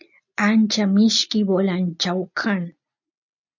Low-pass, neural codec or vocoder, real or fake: 7.2 kHz; none; real